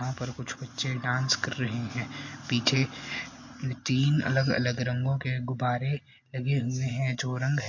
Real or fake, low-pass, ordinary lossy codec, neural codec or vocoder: real; 7.2 kHz; MP3, 48 kbps; none